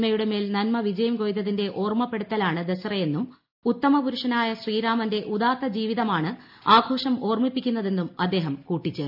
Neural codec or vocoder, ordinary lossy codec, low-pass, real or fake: none; MP3, 48 kbps; 5.4 kHz; real